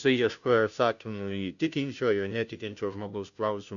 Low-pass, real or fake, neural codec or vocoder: 7.2 kHz; fake; codec, 16 kHz, 0.5 kbps, FunCodec, trained on Chinese and English, 25 frames a second